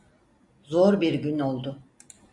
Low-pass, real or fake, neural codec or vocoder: 10.8 kHz; real; none